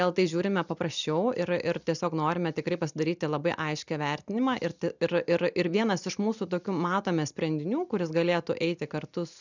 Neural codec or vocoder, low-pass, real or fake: none; 7.2 kHz; real